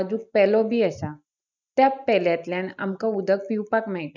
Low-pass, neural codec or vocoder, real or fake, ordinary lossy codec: 7.2 kHz; none; real; none